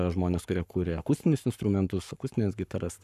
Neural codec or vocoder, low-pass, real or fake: codec, 44.1 kHz, 7.8 kbps, Pupu-Codec; 14.4 kHz; fake